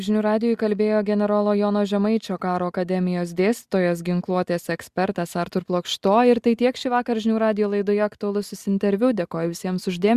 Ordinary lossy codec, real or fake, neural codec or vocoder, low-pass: Opus, 64 kbps; real; none; 14.4 kHz